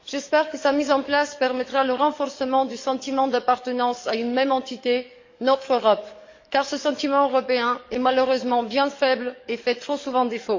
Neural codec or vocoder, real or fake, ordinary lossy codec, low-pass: codec, 44.1 kHz, 7.8 kbps, DAC; fake; MP3, 48 kbps; 7.2 kHz